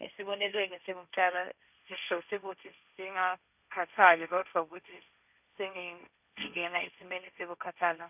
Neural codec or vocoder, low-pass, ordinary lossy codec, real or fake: codec, 16 kHz, 1.1 kbps, Voila-Tokenizer; 3.6 kHz; none; fake